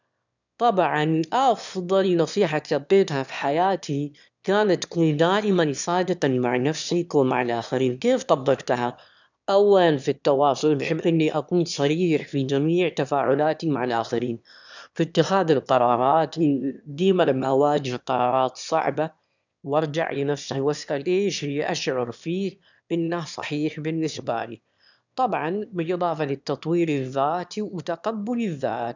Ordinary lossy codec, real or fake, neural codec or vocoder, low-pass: none; fake; autoencoder, 22.05 kHz, a latent of 192 numbers a frame, VITS, trained on one speaker; 7.2 kHz